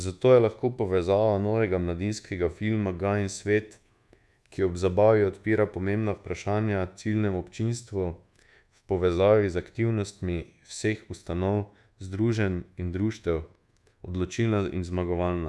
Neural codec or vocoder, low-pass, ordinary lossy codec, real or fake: codec, 24 kHz, 1.2 kbps, DualCodec; none; none; fake